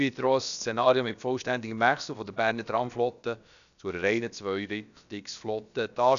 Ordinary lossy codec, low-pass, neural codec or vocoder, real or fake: none; 7.2 kHz; codec, 16 kHz, about 1 kbps, DyCAST, with the encoder's durations; fake